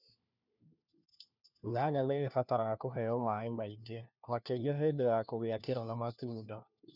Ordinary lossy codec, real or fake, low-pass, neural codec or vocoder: none; fake; 5.4 kHz; codec, 16 kHz, 1 kbps, FunCodec, trained on LibriTTS, 50 frames a second